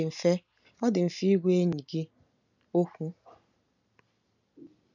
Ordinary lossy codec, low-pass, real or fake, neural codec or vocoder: none; 7.2 kHz; real; none